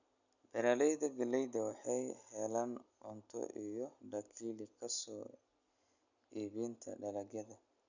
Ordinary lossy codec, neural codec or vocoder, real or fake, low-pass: none; none; real; 7.2 kHz